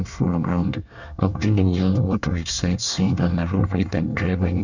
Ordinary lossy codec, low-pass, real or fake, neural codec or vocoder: none; 7.2 kHz; fake; codec, 24 kHz, 1 kbps, SNAC